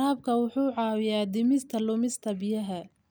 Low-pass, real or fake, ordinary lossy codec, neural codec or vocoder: none; real; none; none